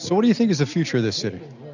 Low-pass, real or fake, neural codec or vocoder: 7.2 kHz; real; none